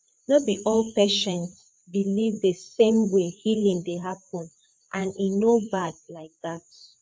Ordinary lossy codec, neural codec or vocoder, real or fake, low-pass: none; codec, 16 kHz, 4 kbps, FreqCodec, larger model; fake; none